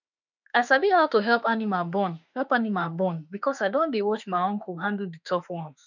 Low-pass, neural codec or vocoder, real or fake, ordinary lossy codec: 7.2 kHz; autoencoder, 48 kHz, 32 numbers a frame, DAC-VAE, trained on Japanese speech; fake; none